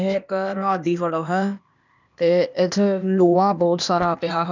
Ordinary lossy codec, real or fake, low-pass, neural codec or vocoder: none; fake; 7.2 kHz; codec, 16 kHz, 0.8 kbps, ZipCodec